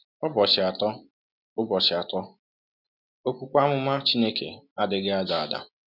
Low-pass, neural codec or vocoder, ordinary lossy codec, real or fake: 5.4 kHz; none; none; real